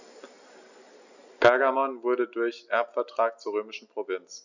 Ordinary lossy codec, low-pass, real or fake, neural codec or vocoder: none; 7.2 kHz; real; none